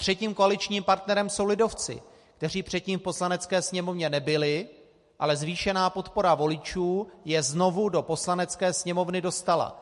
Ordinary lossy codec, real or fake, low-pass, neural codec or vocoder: MP3, 48 kbps; real; 14.4 kHz; none